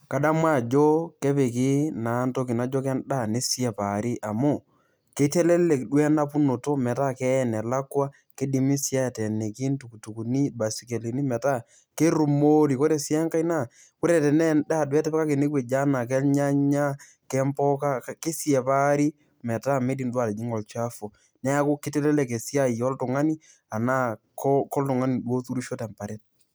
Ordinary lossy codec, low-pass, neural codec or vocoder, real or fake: none; none; none; real